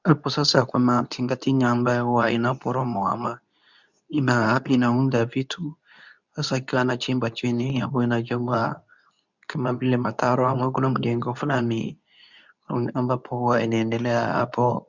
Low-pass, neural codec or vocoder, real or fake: 7.2 kHz; codec, 24 kHz, 0.9 kbps, WavTokenizer, medium speech release version 2; fake